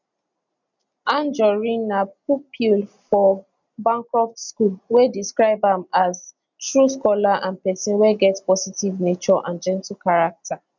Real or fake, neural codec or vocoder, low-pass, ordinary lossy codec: real; none; 7.2 kHz; none